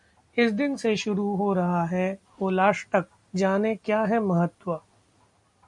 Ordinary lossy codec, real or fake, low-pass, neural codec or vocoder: MP3, 64 kbps; real; 10.8 kHz; none